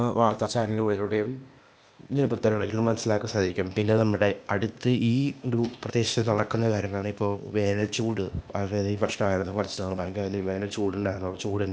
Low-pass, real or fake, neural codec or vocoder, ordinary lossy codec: none; fake; codec, 16 kHz, 0.8 kbps, ZipCodec; none